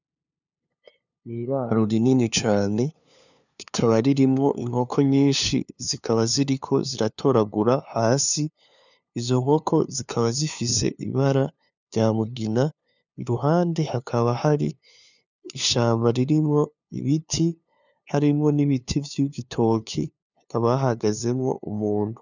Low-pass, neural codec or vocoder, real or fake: 7.2 kHz; codec, 16 kHz, 2 kbps, FunCodec, trained on LibriTTS, 25 frames a second; fake